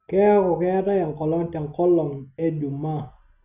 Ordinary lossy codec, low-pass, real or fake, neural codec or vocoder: none; 3.6 kHz; real; none